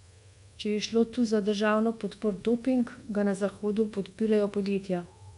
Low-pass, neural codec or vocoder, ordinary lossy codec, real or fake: 10.8 kHz; codec, 24 kHz, 1.2 kbps, DualCodec; none; fake